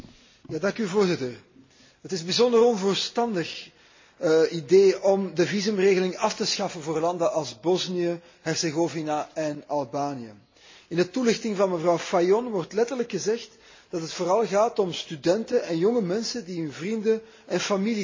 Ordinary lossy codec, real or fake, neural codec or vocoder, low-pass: MP3, 32 kbps; real; none; 7.2 kHz